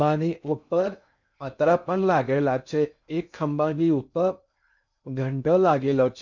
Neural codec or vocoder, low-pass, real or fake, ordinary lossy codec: codec, 16 kHz in and 24 kHz out, 0.6 kbps, FocalCodec, streaming, 2048 codes; 7.2 kHz; fake; none